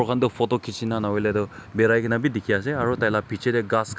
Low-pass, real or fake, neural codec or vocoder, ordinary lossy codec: none; real; none; none